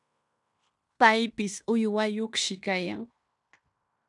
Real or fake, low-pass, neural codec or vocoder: fake; 10.8 kHz; codec, 16 kHz in and 24 kHz out, 0.9 kbps, LongCat-Audio-Codec, fine tuned four codebook decoder